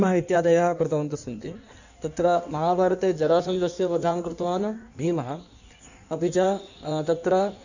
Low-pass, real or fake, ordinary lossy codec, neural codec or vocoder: 7.2 kHz; fake; none; codec, 16 kHz in and 24 kHz out, 1.1 kbps, FireRedTTS-2 codec